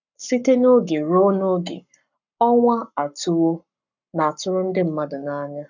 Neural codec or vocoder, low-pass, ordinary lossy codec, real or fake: codec, 44.1 kHz, 7.8 kbps, Pupu-Codec; 7.2 kHz; none; fake